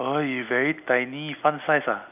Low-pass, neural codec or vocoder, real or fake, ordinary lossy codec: 3.6 kHz; none; real; none